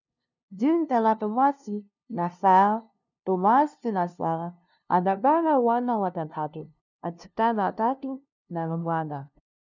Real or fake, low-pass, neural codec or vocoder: fake; 7.2 kHz; codec, 16 kHz, 0.5 kbps, FunCodec, trained on LibriTTS, 25 frames a second